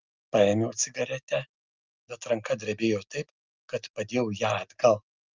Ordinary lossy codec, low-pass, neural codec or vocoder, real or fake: Opus, 24 kbps; 7.2 kHz; none; real